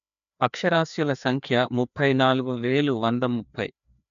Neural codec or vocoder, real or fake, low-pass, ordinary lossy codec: codec, 16 kHz, 2 kbps, FreqCodec, larger model; fake; 7.2 kHz; none